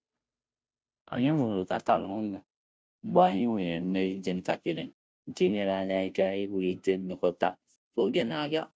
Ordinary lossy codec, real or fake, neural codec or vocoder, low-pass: none; fake; codec, 16 kHz, 0.5 kbps, FunCodec, trained on Chinese and English, 25 frames a second; none